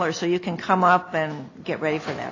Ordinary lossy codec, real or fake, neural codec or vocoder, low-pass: AAC, 32 kbps; real; none; 7.2 kHz